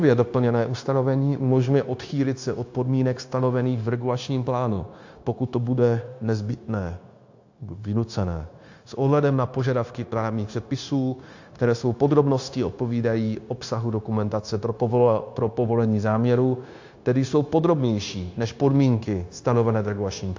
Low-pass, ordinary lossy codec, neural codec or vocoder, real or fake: 7.2 kHz; AAC, 48 kbps; codec, 16 kHz, 0.9 kbps, LongCat-Audio-Codec; fake